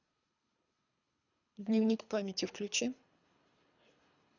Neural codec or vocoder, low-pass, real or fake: codec, 24 kHz, 3 kbps, HILCodec; 7.2 kHz; fake